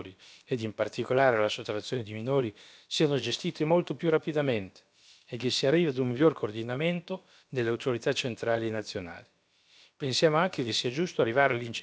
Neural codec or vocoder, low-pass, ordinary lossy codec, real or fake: codec, 16 kHz, about 1 kbps, DyCAST, with the encoder's durations; none; none; fake